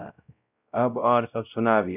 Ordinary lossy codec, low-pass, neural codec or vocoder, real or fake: none; 3.6 kHz; codec, 16 kHz, 0.5 kbps, X-Codec, WavLM features, trained on Multilingual LibriSpeech; fake